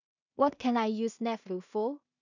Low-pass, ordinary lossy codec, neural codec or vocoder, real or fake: 7.2 kHz; none; codec, 16 kHz in and 24 kHz out, 0.4 kbps, LongCat-Audio-Codec, two codebook decoder; fake